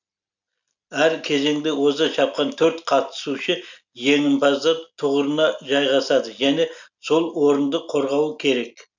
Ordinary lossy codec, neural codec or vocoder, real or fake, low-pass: none; none; real; 7.2 kHz